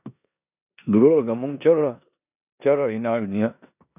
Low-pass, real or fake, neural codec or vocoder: 3.6 kHz; fake; codec, 16 kHz in and 24 kHz out, 0.9 kbps, LongCat-Audio-Codec, four codebook decoder